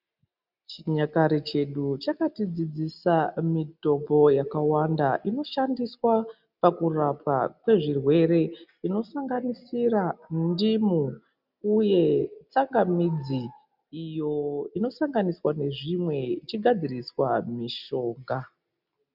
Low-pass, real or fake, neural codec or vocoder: 5.4 kHz; real; none